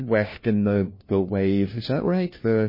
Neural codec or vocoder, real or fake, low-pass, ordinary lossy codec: codec, 16 kHz, 1 kbps, FunCodec, trained on LibriTTS, 50 frames a second; fake; 5.4 kHz; MP3, 24 kbps